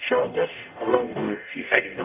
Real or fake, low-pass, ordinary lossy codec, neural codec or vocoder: fake; 3.6 kHz; none; codec, 44.1 kHz, 0.9 kbps, DAC